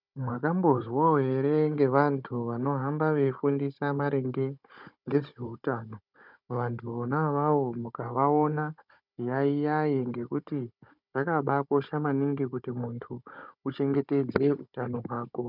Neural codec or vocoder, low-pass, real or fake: codec, 16 kHz, 16 kbps, FunCodec, trained on Chinese and English, 50 frames a second; 5.4 kHz; fake